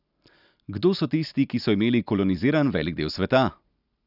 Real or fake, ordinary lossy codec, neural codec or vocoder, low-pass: real; none; none; 5.4 kHz